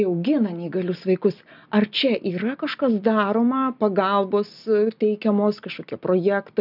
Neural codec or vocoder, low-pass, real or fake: none; 5.4 kHz; real